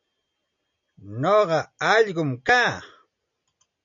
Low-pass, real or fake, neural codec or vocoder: 7.2 kHz; real; none